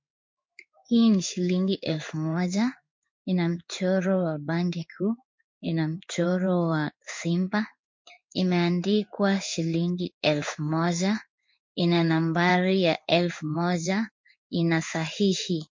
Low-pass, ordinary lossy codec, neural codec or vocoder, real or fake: 7.2 kHz; MP3, 48 kbps; codec, 16 kHz in and 24 kHz out, 1 kbps, XY-Tokenizer; fake